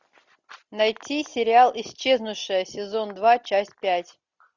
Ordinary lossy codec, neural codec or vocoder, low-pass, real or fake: Opus, 64 kbps; none; 7.2 kHz; real